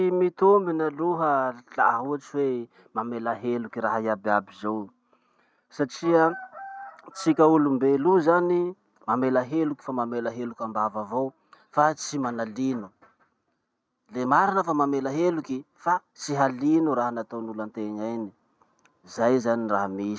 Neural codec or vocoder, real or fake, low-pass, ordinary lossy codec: none; real; none; none